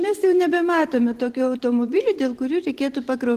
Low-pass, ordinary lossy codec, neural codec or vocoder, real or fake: 14.4 kHz; Opus, 16 kbps; vocoder, 44.1 kHz, 128 mel bands every 512 samples, BigVGAN v2; fake